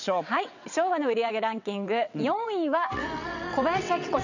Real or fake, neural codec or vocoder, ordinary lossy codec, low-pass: fake; vocoder, 22.05 kHz, 80 mel bands, WaveNeXt; none; 7.2 kHz